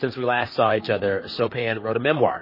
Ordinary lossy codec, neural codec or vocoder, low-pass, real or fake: MP3, 24 kbps; vocoder, 44.1 kHz, 128 mel bands, Pupu-Vocoder; 5.4 kHz; fake